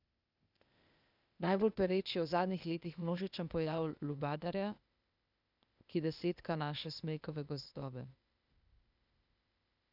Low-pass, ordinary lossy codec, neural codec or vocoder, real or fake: 5.4 kHz; none; codec, 16 kHz, 0.8 kbps, ZipCodec; fake